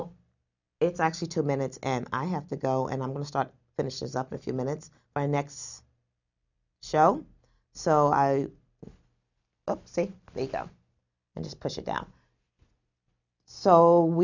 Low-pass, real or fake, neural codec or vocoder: 7.2 kHz; real; none